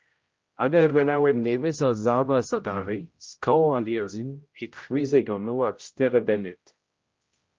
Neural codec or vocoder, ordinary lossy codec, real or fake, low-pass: codec, 16 kHz, 0.5 kbps, X-Codec, HuBERT features, trained on general audio; Opus, 24 kbps; fake; 7.2 kHz